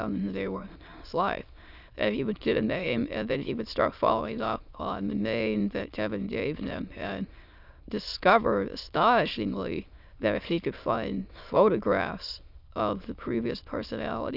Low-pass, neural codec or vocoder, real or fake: 5.4 kHz; autoencoder, 22.05 kHz, a latent of 192 numbers a frame, VITS, trained on many speakers; fake